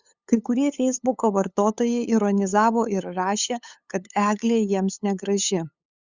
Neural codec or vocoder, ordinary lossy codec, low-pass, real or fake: codec, 16 kHz, 8 kbps, FunCodec, trained on LibriTTS, 25 frames a second; Opus, 64 kbps; 7.2 kHz; fake